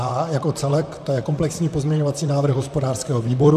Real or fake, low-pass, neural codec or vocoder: fake; 14.4 kHz; vocoder, 44.1 kHz, 128 mel bands, Pupu-Vocoder